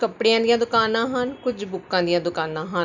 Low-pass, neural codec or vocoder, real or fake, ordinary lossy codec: 7.2 kHz; none; real; none